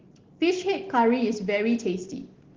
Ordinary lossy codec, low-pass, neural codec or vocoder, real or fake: Opus, 16 kbps; 7.2 kHz; none; real